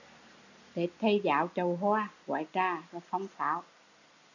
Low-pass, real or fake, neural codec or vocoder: 7.2 kHz; real; none